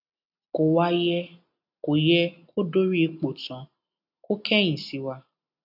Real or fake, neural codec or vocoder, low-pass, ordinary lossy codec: real; none; 5.4 kHz; none